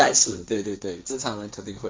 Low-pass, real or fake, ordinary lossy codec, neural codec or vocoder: none; fake; none; codec, 16 kHz, 1.1 kbps, Voila-Tokenizer